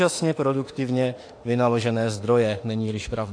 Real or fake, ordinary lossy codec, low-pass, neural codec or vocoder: fake; AAC, 48 kbps; 9.9 kHz; autoencoder, 48 kHz, 32 numbers a frame, DAC-VAE, trained on Japanese speech